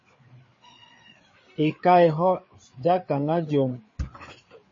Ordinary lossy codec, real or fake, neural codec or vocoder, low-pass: MP3, 32 kbps; fake; codec, 16 kHz, 4 kbps, FreqCodec, larger model; 7.2 kHz